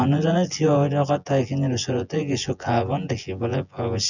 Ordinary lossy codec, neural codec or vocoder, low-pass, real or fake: none; vocoder, 24 kHz, 100 mel bands, Vocos; 7.2 kHz; fake